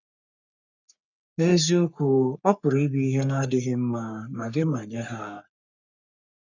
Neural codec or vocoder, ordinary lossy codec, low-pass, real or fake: codec, 44.1 kHz, 3.4 kbps, Pupu-Codec; none; 7.2 kHz; fake